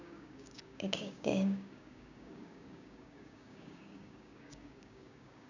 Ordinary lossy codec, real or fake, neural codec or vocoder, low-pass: none; fake; codec, 16 kHz, 6 kbps, DAC; 7.2 kHz